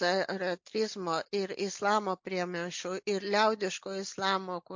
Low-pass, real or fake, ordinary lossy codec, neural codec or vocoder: 7.2 kHz; real; MP3, 48 kbps; none